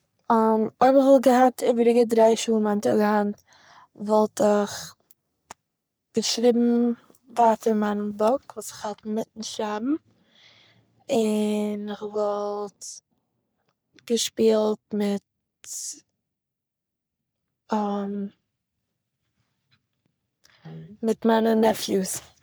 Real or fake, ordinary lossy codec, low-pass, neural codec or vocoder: fake; none; none; codec, 44.1 kHz, 3.4 kbps, Pupu-Codec